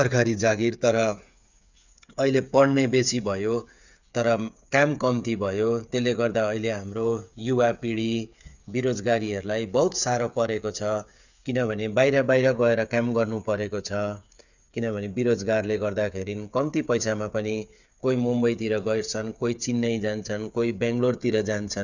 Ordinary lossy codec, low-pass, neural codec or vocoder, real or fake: none; 7.2 kHz; codec, 16 kHz, 8 kbps, FreqCodec, smaller model; fake